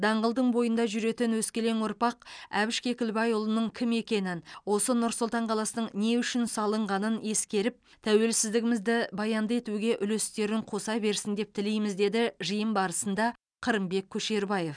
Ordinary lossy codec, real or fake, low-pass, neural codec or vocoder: none; real; 9.9 kHz; none